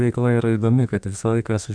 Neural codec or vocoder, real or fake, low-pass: codec, 32 kHz, 1.9 kbps, SNAC; fake; 9.9 kHz